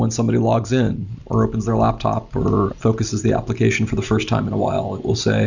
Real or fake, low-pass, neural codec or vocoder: real; 7.2 kHz; none